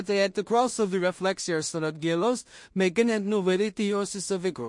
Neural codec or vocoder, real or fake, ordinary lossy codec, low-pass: codec, 16 kHz in and 24 kHz out, 0.4 kbps, LongCat-Audio-Codec, two codebook decoder; fake; MP3, 48 kbps; 10.8 kHz